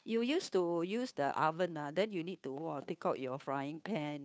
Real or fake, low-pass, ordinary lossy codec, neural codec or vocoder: fake; none; none; codec, 16 kHz, 2 kbps, FunCodec, trained on Chinese and English, 25 frames a second